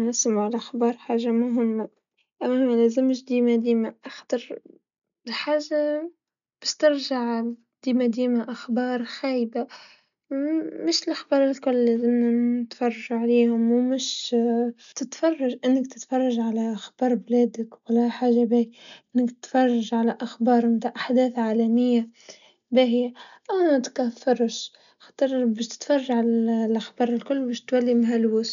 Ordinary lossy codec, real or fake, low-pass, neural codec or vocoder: none; real; 7.2 kHz; none